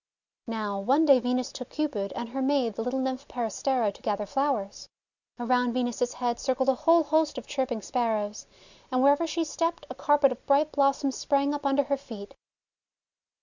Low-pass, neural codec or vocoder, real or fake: 7.2 kHz; none; real